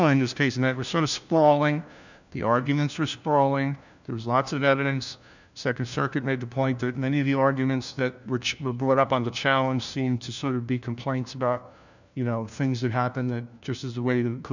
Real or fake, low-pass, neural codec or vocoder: fake; 7.2 kHz; codec, 16 kHz, 1 kbps, FunCodec, trained on LibriTTS, 50 frames a second